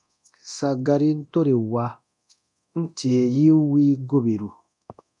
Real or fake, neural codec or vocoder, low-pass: fake; codec, 24 kHz, 0.9 kbps, DualCodec; 10.8 kHz